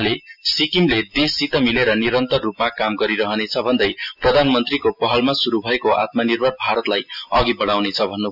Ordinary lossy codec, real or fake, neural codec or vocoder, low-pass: AAC, 48 kbps; real; none; 5.4 kHz